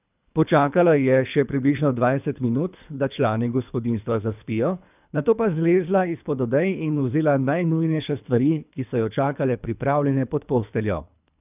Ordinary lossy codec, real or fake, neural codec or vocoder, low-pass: none; fake; codec, 24 kHz, 3 kbps, HILCodec; 3.6 kHz